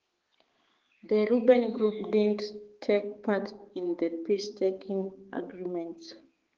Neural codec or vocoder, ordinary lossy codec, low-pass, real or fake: codec, 16 kHz, 4 kbps, X-Codec, HuBERT features, trained on balanced general audio; Opus, 16 kbps; 7.2 kHz; fake